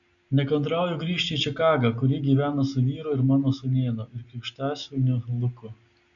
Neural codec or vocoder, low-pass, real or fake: none; 7.2 kHz; real